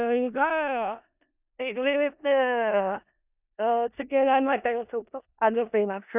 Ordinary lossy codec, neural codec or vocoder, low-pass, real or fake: none; codec, 16 kHz in and 24 kHz out, 0.4 kbps, LongCat-Audio-Codec, four codebook decoder; 3.6 kHz; fake